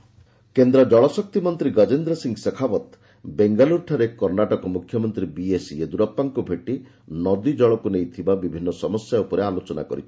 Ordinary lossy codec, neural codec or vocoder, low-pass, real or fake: none; none; none; real